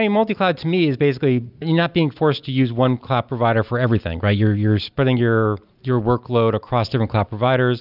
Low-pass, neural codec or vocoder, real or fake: 5.4 kHz; none; real